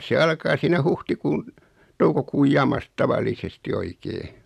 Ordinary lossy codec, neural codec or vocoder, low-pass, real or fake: none; vocoder, 44.1 kHz, 128 mel bands every 256 samples, BigVGAN v2; 14.4 kHz; fake